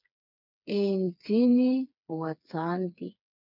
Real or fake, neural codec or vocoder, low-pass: fake; codec, 16 kHz, 4 kbps, FreqCodec, smaller model; 5.4 kHz